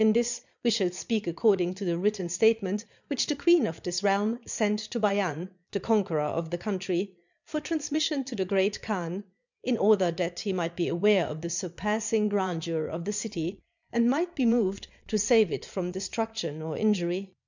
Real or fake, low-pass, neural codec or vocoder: fake; 7.2 kHz; vocoder, 22.05 kHz, 80 mel bands, Vocos